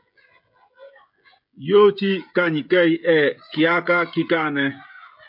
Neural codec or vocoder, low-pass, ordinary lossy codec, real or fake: codec, 16 kHz, 16 kbps, FreqCodec, smaller model; 5.4 kHz; MP3, 48 kbps; fake